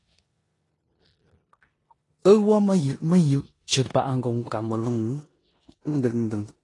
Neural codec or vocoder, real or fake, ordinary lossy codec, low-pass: codec, 16 kHz in and 24 kHz out, 0.9 kbps, LongCat-Audio-Codec, four codebook decoder; fake; AAC, 32 kbps; 10.8 kHz